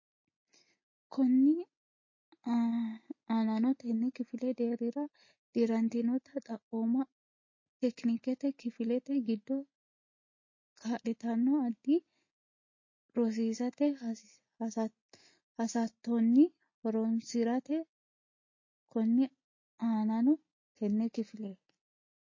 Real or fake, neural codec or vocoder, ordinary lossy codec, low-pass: real; none; MP3, 32 kbps; 7.2 kHz